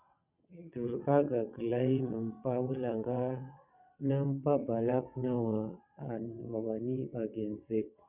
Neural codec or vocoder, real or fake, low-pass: vocoder, 22.05 kHz, 80 mel bands, WaveNeXt; fake; 3.6 kHz